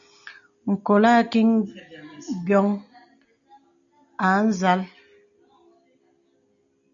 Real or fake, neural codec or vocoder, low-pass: real; none; 7.2 kHz